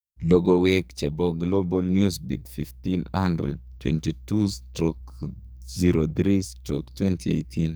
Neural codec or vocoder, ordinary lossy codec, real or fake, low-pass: codec, 44.1 kHz, 2.6 kbps, SNAC; none; fake; none